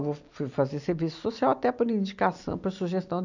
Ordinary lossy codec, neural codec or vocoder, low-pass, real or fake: none; none; 7.2 kHz; real